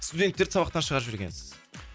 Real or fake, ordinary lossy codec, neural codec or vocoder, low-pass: real; none; none; none